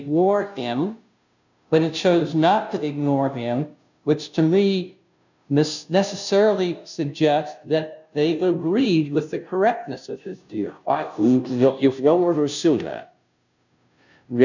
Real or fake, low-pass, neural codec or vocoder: fake; 7.2 kHz; codec, 16 kHz, 0.5 kbps, FunCodec, trained on Chinese and English, 25 frames a second